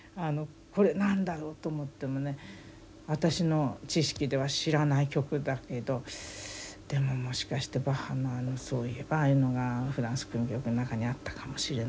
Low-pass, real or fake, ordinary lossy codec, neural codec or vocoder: none; real; none; none